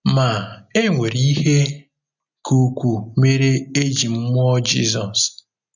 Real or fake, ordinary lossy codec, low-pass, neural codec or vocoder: real; none; 7.2 kHz; none